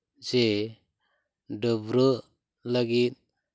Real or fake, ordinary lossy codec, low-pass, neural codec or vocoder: real; none; none; none